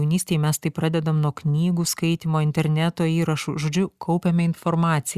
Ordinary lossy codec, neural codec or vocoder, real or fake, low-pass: Opus, 64 kbps; none; real; 14.4 kHz